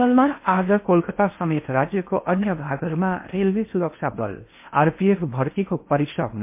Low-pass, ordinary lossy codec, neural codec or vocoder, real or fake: 3.6 kHz; MP3, 24 kbps; codec, 16 kHz in and 24 kHz out, 0.6 kbps, FocalCodec, streaming, 4096 codes; fake